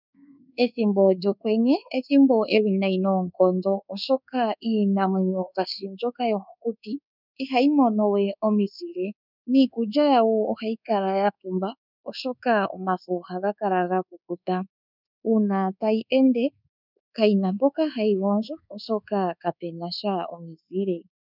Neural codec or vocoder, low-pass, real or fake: codec, 24 kHz, 1.2 kbps, DualCodec; 5.4 kHz; fake